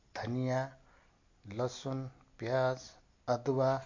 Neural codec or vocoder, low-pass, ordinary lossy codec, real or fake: none; 7.2 kHz; MP3, 48 kbps; real